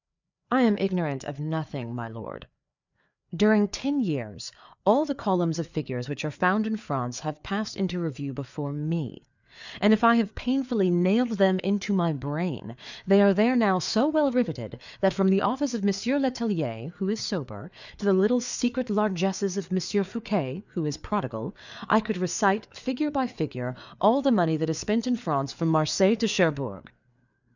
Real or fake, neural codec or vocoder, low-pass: fake; codec, 16 kHz, 4 kbps, FreqCodec, larger model; 7.2 kHz